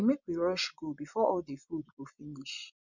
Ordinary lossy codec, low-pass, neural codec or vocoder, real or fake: none; 7.2 kHz; vocoder, 24 kHz, 100 mel bands, Vocos; fake